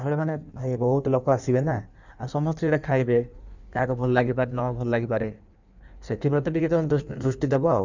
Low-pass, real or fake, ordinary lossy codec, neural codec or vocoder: 7.2 kHz; fake; none; codec, 16 kHz in and 24 kHz out, 1.1 kbps, FireRedTTS-2 codec